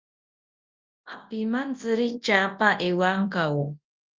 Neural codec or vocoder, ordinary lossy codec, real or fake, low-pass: codec, 24 kHz, 0.9 kbps, WavTokenizer, large speech release; Opus, 24 kbps; fake; 7.2 kHz